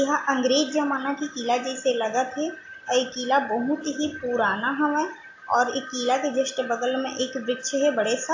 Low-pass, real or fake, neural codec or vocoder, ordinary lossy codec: 7.2 kHz; real; none; AAC, 48 kbps